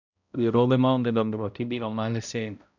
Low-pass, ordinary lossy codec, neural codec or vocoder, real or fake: 7.2 kHz; none; codec, 16 kHz, 0.5 kbps, X-Codec, HuBERT features, trained on balanced general audio; fake